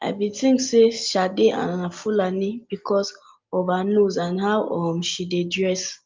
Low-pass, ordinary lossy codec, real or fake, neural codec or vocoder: 7.2 kHz; Opus, 24 kbps; real; none